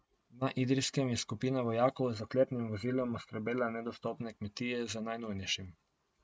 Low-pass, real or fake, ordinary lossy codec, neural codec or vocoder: none; real; none; none